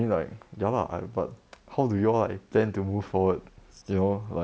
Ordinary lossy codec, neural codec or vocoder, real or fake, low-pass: none; none; real; none